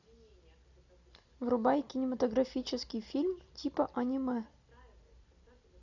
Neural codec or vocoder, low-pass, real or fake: none; 7.2 kHz; real